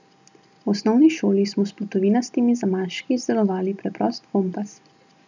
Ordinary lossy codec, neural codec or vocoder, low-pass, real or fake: none; none; 7.2 kHz; real